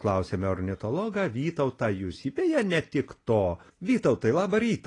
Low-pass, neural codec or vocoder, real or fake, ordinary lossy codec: 10.8 kHz; none; real; AAC, 32 kbps